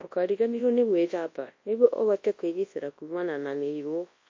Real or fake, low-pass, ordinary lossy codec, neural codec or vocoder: fake; 7.2 kHz; MP3, 32 kbps; codec, 24 kHz, 0.9 kbps, WavTokenizer, large speech release